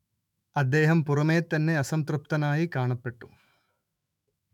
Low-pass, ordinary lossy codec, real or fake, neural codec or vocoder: 19.8 kHz; none; fake; autoencoder, 48 kHz, 128 numbers a frame, DAC-VAE, trained on Japanese speech